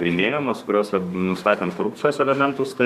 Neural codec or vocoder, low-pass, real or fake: codec, 32 kHz, 1.9 kbps, SNAC; 14.4 kHz; fake